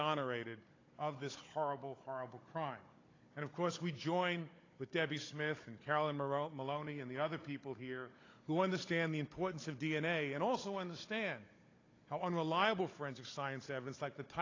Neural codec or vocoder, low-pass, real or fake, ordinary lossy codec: codec, 16 kHz, 16 kbps, FunCodec, trained on Chinese and English, 50 frames a second; 7.2 kHz; fake; AAC, 32 kbps